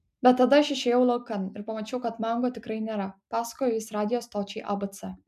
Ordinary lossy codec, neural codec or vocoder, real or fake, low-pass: MP3, 96 kbps; none; real; 14.4 kHz